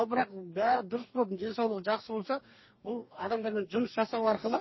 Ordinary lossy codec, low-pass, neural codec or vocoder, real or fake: MP3, 24 kbps; 7.2 kHz; codec, 44.1 kHz, 2.6 kbps, DAC; fake